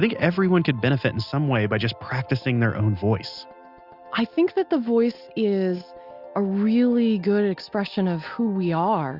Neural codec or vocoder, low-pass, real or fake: none; 5.4 kHz; real